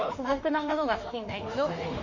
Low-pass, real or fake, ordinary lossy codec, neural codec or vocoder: 7.2 kHz; fake; Opus, 64 kbps; autoencoder, 48 kHz, 32 numbers a frame, DAC-VAE, trained on Japanese speech